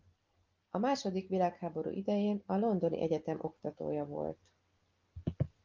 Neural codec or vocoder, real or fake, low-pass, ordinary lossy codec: none; real; 7.2 kHz; Opus, 32 kbps